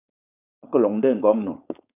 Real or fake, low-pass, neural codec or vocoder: fake; 3.6 kHz; codec, 16 kHz, 4.8 kbps, FACodec